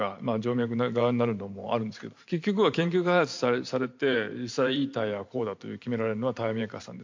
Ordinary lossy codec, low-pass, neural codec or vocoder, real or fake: MP3, 48 kbps; 7.2 kHz; vocoder, 44.1 kHz, 128 mel bands every 512 samples, BigVGAN v2; fake